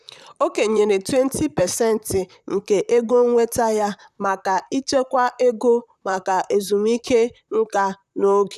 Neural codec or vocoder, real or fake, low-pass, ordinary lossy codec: vocoder, 44.1 kHz, 128 mel bands, Pupu-Vocoder; fake; 14.4 kHz; none